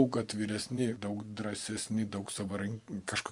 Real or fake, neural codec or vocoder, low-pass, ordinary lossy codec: fake; vocoder, 24 kHz, 100 mel bands, Vocos; 10.8 kHz; Opus, 64 kbps